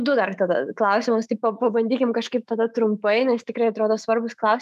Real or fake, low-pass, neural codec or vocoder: fake; 14.4 kHz; autoencoder, 48 kHz, 128 numbers a frame, DAC-VAE, trained on Japanese speech